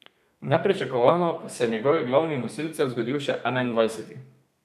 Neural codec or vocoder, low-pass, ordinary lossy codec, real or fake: codec, 32 kHz, 1.9 kbps, SNAC; 14.4 kHz; none; fake